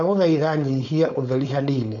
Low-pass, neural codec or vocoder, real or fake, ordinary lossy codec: 7.2 kHz; codec, 16 kHz, 4.8 kbps, FACodec; fake; none